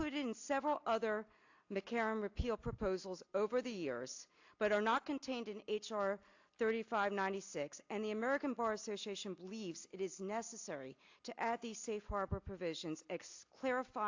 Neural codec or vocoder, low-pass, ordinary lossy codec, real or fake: none; 7.2 kHz; AAC, 48 kbps; real